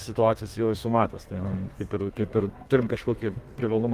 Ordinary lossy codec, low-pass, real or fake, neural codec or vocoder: Opus, 32 kbps; 14.4 kHz; fake; codec, 32 kHz, 1.9 kbps, SNAC